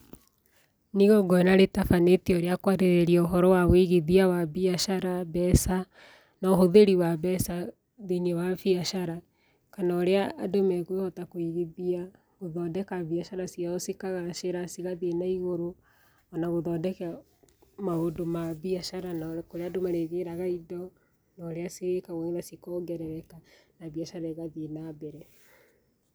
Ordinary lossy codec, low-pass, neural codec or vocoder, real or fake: none; none; none; real